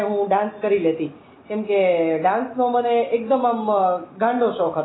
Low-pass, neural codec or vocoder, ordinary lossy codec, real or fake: 7.2 kHz; none; AAC, 16 kbps; real